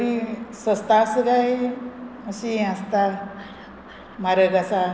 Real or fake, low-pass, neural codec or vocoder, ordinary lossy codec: real; none; none; none